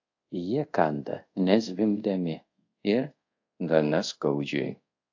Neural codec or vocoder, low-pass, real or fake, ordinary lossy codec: codec, 24 kHz, 0.5 kbps, DualCodec; 7.2 kHz; fake; AAC, 48 kbps